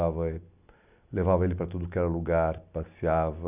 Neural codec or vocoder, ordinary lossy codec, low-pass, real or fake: none; none; 3.6 kHz; real